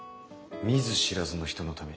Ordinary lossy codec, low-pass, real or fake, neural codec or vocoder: none; none; real; none